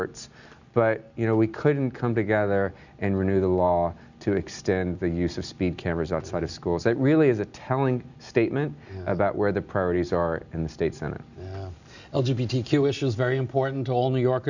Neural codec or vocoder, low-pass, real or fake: none; 7.2 kHz; real